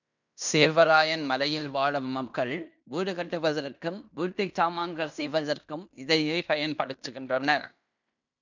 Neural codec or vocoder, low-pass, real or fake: codec, 16 kHz in and 24 kHz out, 0.9 kbps, LongCat-Audio-Codec, fine tuned four codebook decoder; 7.2 kHz; fake